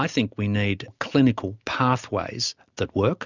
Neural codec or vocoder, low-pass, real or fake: none; 7.2 kHz; real